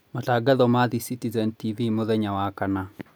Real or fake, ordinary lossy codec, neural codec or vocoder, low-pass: real; none; none; none